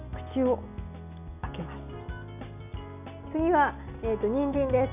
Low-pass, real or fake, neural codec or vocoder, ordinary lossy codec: 3.6 kHz; real; none; none